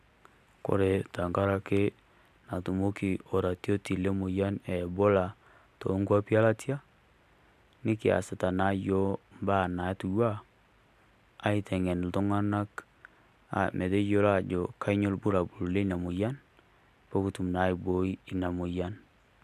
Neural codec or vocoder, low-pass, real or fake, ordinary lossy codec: none; 14.4 kHz; real; MP3, 96 kbps